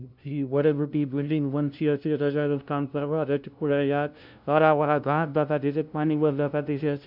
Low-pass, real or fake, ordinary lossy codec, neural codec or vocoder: 5.4 kHz; fake; none; codec, 16 kHz, 0.5 kbps, FunCodec, trained on LibriTTS, 25 frames a second